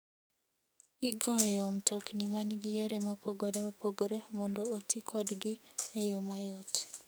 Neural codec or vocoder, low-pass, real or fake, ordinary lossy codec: codec, 44.1 kHz, 2.6 kbps, SNAC; none; fake; none